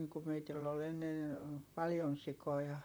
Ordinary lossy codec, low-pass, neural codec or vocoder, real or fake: none; none; vocoder, 44.1 kHz, 128 mel bands, Pupu-Vocoder; fake